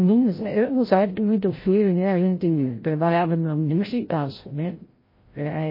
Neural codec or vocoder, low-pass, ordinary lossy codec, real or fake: codec, 16 kHz, 0.5 kbps, FreqCodec, larger model; 5.4 kHz; MP3, 24 kbps; fake